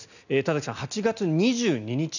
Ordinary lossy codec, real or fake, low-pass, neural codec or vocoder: none; real; 7.2 kHz; none